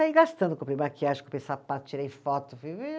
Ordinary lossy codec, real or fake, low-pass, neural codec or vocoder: none; real; none; none